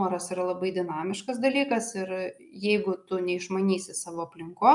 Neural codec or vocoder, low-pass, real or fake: none; 10.8 kHz; real